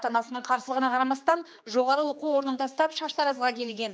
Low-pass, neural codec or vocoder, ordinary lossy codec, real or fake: none; codec, 16 kHz, 2 kbps, X-Codec, HuBERT features, trained on general audio; none; fake